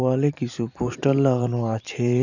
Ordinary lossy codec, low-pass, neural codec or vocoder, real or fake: none; 7.2 kHz; vocoder, 44.1 kHz, 128 mel bands every 512 samples, BigVGAN v2; fake